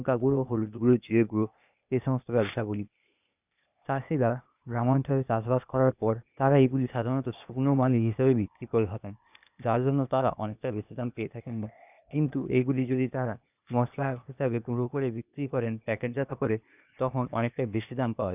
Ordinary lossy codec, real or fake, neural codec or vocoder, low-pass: none; fake; codec, 16 kHz, 0.8 kbps, ZipCodec; 3.6 kHz